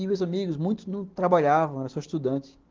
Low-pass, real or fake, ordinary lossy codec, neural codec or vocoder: 7.2 kHz; real; Opus, 16 kbps; none